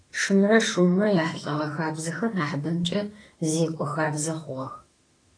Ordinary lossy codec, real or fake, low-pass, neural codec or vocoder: AAC, 32 kbps; fake; 9.9 kHz; autoencoder, 48 kHz, 32 numbers a frame, DAC-VAE, trained on Japanese speech